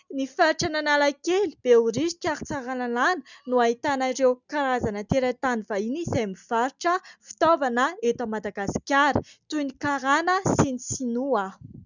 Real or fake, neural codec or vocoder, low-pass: real; none; 7.2 kHz